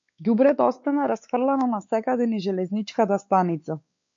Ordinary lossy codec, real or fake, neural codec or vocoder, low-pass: MP3, 48 kbps; fake; codec, 16 kHz, 4 kbps, X-Codec, WavLM features, trained on Multilingual LibriSpeech; 7.2 kHz